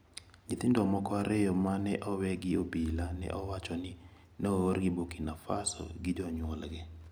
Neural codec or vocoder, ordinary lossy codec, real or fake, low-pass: none; none; real; none